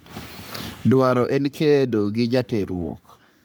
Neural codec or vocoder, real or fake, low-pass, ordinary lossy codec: codec, 44.1 kHz, 3.4 kbps, Pupu-Codec; fake; none; none